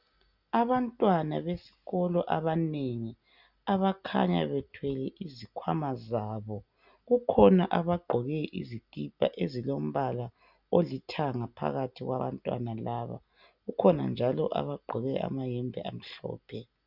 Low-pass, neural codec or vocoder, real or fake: 5.4 kHz; none; real